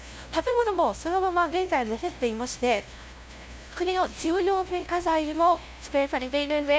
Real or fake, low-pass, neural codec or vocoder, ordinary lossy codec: fake; none; codec, 16 kHz, 0.5 kbps, FunCodec, trained on LibriTTS, 25 frames a second; none